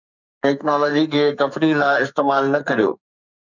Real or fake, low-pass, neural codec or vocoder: fake; 7.2 kHz; codec, 44.1 kHz, 2.6 kbps, SNAC